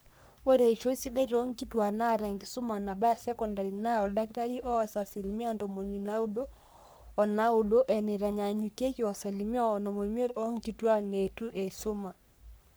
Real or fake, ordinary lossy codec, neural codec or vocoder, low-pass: fake; none; codec, 44.1 kHz, 3.4 kbps, Pupu-Codec; none